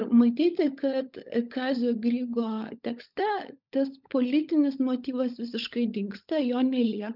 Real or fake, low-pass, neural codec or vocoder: fake; 5.4 kHz; codec, 16 kHz, 16 kbps, FunCodec, trained on LibriTTS, 50 frames a second